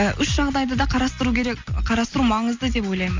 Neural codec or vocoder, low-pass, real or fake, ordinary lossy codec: none; 7.2 kHz; real; MP3, 64 kbps